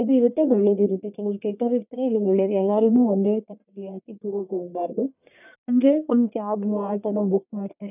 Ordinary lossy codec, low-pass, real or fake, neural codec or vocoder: none; 3.6 kHz; fake; codec, 44.1 kHz, 1.7 kbps, Pupu-Codec